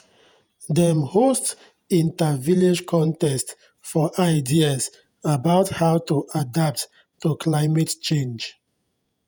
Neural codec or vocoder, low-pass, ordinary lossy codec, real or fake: vocoder, 48 kHz, 128 mel bands, Vocos; none; none; fake